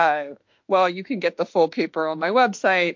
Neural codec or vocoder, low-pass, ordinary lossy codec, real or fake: autoencoder, 48 kHz, 32 numbers a frame, DAC-VAE, trained on Japanese speech; 7.2 kHz; MP3, 48 kbps; fake